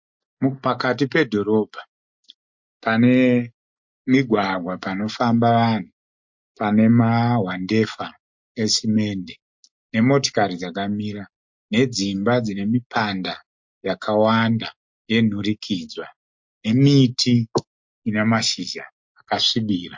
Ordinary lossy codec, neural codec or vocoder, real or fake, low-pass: MP3, 48 kbps; none; real; 7.2 kHz